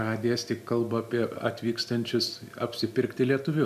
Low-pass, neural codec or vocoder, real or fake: 14.4 kHz; none; real